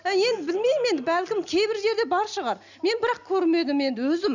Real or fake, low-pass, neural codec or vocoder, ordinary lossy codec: real; 7.2 kHz; none; none